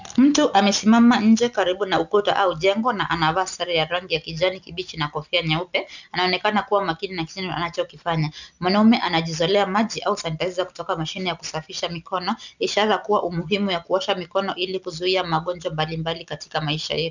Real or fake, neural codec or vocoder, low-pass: real; none; 7.2 kHz